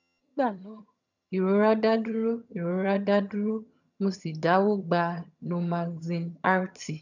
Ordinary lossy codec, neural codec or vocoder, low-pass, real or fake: none; vocoder, 22.05 kHz, 80 mel bands, HiFi-GAN; 7.2 kHz; fake